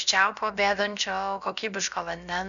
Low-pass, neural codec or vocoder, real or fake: 7.2 kHz; codec, 16 kHz, about 1 kbps, DyCAST, with the encoder's durations; fake